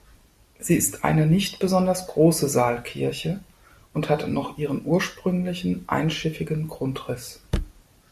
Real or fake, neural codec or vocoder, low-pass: real; none; 14.4 kHz